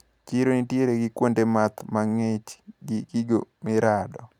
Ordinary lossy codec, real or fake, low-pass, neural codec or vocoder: none; real; 19.8 kHz; none